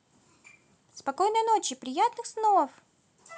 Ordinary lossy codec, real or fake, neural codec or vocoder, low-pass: none; real; none; none